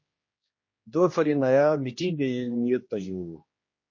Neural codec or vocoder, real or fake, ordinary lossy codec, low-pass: codec, 16 kHz, 1 kbps, X-Codec, HuBERT features, trained on general audio; fake; MP3, 32 kbps; 7.2 kHz